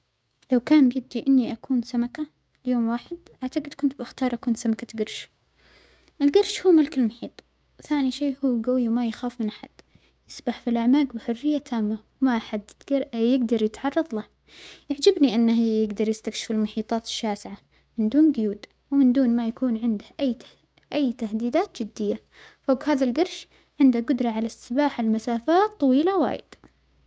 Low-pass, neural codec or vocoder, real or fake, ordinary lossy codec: none; codec, 16 kHz, 6 kbps, DAC; fake; none